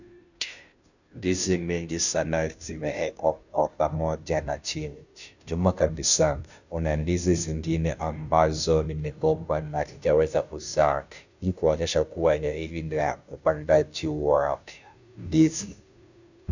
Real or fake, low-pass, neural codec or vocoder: fake; 7.2 kHz; codec, 16 kHz, 0.5 kbps, FunCodec, trained on Chinese and English, 25 frames a second